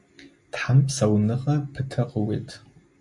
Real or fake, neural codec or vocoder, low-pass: real; none; 10.8 kHz